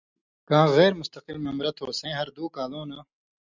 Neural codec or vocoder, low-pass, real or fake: none; 7.2 kHz; real